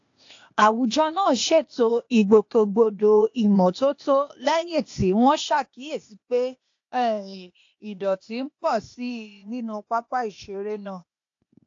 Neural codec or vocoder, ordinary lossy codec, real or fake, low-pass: codec, 16 kHz, 0.8 kbps, ZipCodec; AAC, 48 kbps; fake; 7.2 kHz